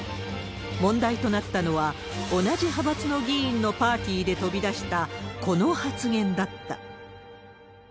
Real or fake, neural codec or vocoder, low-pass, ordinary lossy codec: real; none; none; none